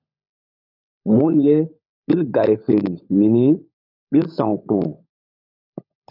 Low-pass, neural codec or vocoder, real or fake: 5.4 kHz; codec, 16 kHz, 16 kbps, FunCodec, trained on LibriTTS, 50 frames a second; fake